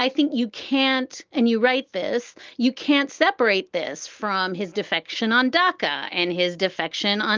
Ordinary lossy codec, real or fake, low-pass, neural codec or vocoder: Opus, 32 kbps; real; 7.2 kHz; none